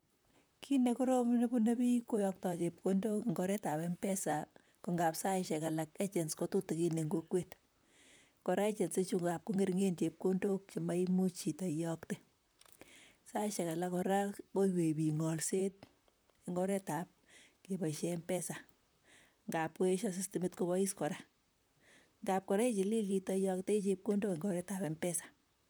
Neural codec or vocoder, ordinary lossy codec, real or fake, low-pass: vocoder, 44.1 kHz, 128 mel bands, Pupu-Vocoder; none; fake; none